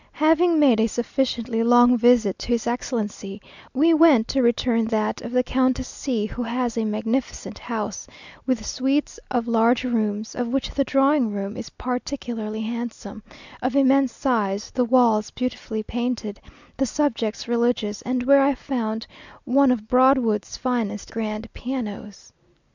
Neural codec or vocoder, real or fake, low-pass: none; real; 7.2 kHz